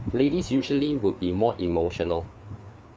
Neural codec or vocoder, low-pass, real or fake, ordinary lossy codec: codec, 16 kHz, 4 kbps, FreqCodec, larger model; none; fake; none